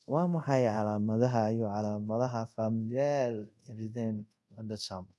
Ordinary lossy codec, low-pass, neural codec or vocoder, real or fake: none; none; codec, 24 kHz, 0.5 kbps, DualCodec; fake